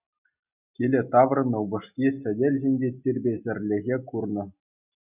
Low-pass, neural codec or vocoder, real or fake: 3.6 kHz; none; real